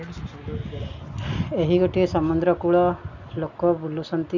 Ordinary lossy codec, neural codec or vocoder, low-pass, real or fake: none; none; 7.2 kHz; real